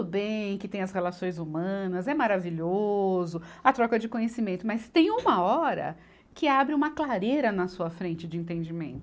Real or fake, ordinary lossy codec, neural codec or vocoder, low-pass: real; none; none; none